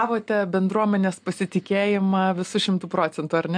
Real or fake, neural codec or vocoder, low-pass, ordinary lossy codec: real; none; 9.9 kHz; MP3, 64 kbps